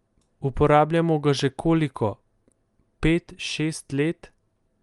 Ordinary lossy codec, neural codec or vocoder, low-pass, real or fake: Opus, 32 kbps; none; 10.8 kHz; real